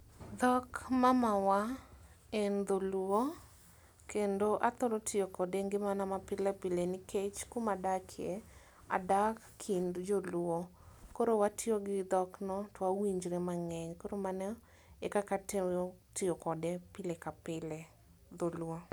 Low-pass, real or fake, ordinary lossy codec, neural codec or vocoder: none; real; none; none